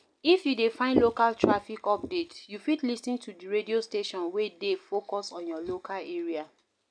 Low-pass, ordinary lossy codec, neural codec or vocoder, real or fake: 9.9 kHz; none; none; real